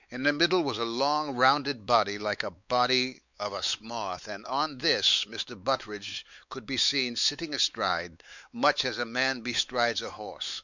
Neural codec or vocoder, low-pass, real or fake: codec, 16 kHz, 4 kbps, X-Codec, WavLM features, trained on Multilingual LibriSpeech; 7.2 kHz; fake